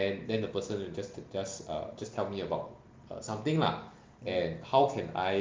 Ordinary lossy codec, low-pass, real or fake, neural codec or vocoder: Opus, 32 kbps; 7.2 kHz; real; none